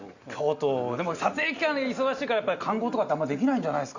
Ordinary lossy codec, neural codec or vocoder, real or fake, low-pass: Opus, 64 kbps; none; real; 7.2 kHz